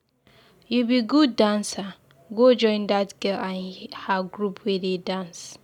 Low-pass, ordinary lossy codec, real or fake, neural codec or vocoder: 19.8 kHz; none; real; none